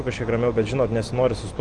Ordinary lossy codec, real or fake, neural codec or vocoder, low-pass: Opus, 32 kbps; real; none; 10.8 kHz